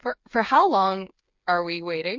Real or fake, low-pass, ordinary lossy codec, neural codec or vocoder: fake; 7.2 kHz; MP3, 48 kbps; codec, 16 kHz, 4 kbps, FreqCodec, smaller model